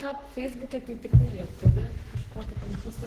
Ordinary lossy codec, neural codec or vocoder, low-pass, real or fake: Opus, 16 kbps; codec, 44.1 kHz, 3.4 kbps, Pupu-Codec; 14.4 kHz; fake